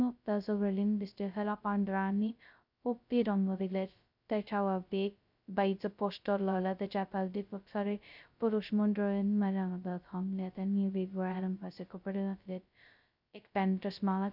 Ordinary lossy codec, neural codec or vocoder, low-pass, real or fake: none; codec, 16 kHz, 0.2 kbps, FocalCodec; 5.4 kHz; fake